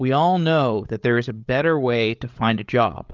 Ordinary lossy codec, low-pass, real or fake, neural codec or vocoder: Opus, 24 kbps; 7.2 kHz; fake; codec, 16 kHz, 8 kbps, FreqCodec, larger model